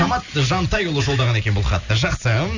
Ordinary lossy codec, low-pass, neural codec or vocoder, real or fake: none; 7.2 kHz; none; real